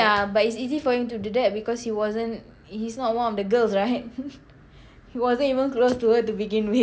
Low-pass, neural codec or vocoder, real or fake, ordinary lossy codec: none; none; real; none